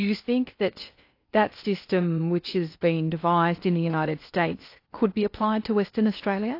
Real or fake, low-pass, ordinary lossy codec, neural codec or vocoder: fake; 5.4 kHz; AAC, 32 kbps; codec, 16 kHz in and 24 kHz out, 0.8 kbps, FocalCodec, streaming, 65536 codes